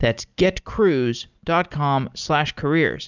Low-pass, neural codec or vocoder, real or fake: 7.2 kHz; none; real